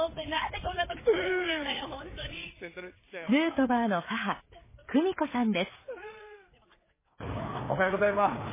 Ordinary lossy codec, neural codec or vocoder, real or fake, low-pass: MP3, 16 kbps; codec, 16 kHz, 4 kbps, FreqCodec, larger model; fake; 3.6 kHz